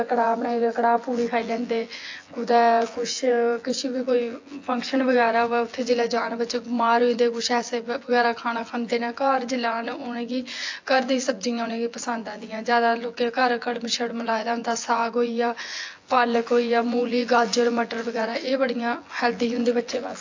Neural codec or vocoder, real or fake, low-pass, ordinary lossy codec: vocoder, 24 kHz, 100 mel bands, Vocos; fake; 7.2 kHz; AAC, 48 kbps